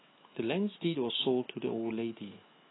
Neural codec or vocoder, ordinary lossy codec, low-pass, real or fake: autoencoder, 48 kHz, 128 numbers a frame, DAC-VAE, trained on Japanese speech; AAC, 16 kbps; 7.2 kHz; fake